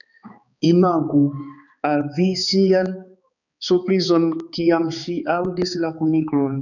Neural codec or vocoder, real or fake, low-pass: codec, 16 kHz, 4 kbps, X-Codec, HuBERT features, trained on balanced general audio; fake; 7.2 kHz